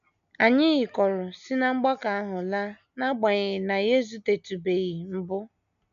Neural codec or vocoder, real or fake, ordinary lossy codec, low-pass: none; real; none; 7.2 kHz